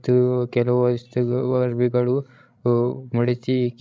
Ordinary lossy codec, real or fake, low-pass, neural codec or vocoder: none; fake; none; codec, 16 kHz, 16 kbps, FreqCodec, larger model